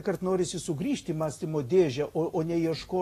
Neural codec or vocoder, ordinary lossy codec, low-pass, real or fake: none; AAC, 48 kbps; 14.4 kHz; real